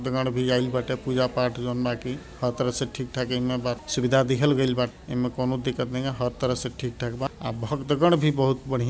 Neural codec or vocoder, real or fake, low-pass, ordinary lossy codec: none; real; none; none